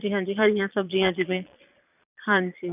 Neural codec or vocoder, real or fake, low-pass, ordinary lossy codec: vocoder, 22.05 kHz, 80 mel bands, Vocos; fake; 3.6 kHz; none